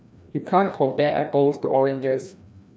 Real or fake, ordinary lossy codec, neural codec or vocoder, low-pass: fake; none; codec, 16 kHz, 1 kbps, FreqCodec, larger model; none